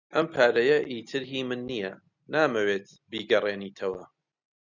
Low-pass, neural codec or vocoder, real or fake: 7.2 kHz; none; real